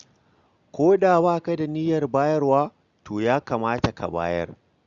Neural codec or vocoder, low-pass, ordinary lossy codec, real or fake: none; 7.2 kHz; Opus, 64 kbps; real